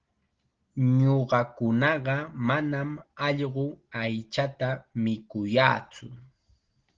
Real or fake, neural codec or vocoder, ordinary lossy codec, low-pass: real; none; Opus, 16 kbps; 7.2 kHz